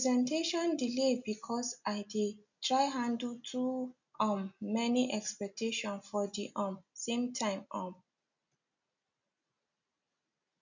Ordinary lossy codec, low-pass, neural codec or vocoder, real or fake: none; 7.2 kHz; none; real